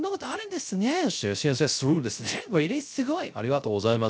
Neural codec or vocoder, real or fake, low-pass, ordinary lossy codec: codec, 16 kHz, 0.3 kbps, FocalCodec; fake; none; none